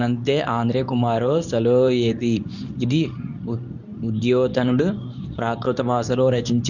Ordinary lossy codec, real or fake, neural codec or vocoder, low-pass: none; fake; codec, 24 kHz, 0.9 kbps, WavTokenizer, medium speech release version 2; 7.2 kHz